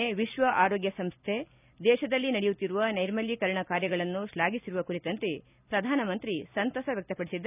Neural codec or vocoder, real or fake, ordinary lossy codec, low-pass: none; real; none; 3.6 kHz